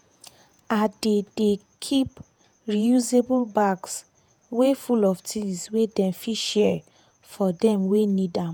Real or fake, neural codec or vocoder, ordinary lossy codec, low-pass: fake; vocoder, 48 kHz, 128 mel bands, Vocos; none; none